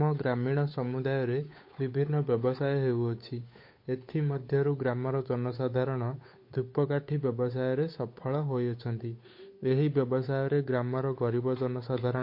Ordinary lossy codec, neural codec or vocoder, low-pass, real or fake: MP3, 32 kbps; codec, 16 kHz, 8 kbps, FunCodec, trained on Chinese and English, 25 frames a second; 5.4 kHz; fake